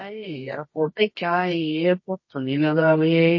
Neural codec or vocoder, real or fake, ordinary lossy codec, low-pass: codec, 24 kHz, 0.9 kbps, WavTokenizer, medium music audio release; fake; MP3, 32 kbps; 7.2 kHz